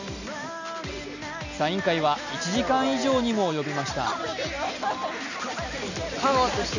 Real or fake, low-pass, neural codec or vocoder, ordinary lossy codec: real; 7.2 kHz; none; none